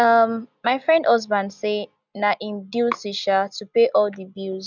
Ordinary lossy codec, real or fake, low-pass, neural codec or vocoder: none; real; 7.2 kHz; none